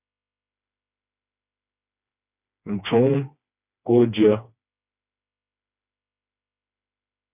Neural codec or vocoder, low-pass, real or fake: codec, 16 kHz, 2 kbps, FreqCodec, smaller model; 3.6 kHz; fake